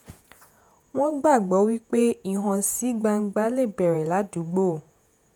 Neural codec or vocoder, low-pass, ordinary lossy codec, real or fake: vocoder, 48 kHz, 128 mel bands, Vocos; none; none; fake